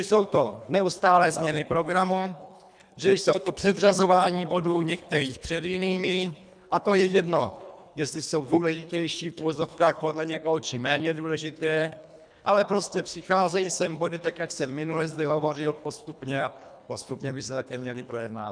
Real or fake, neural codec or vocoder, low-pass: fake; codec, 24 kHz, 1.5 kbps, HILCodec; 9.9 kHz